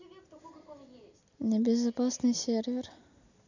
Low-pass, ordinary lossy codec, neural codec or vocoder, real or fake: 7.2 kHz; none; none; real